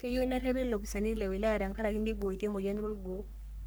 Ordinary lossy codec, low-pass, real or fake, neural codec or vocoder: none; none; fake; codec, 44.1 kHz, 3.4 kbps, Pupu-Codec